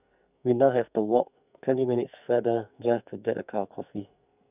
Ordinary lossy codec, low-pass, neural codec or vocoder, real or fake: none; 3.6 kHz; codec, 44.1 kHz, 2.6 kbps, SNAC; fake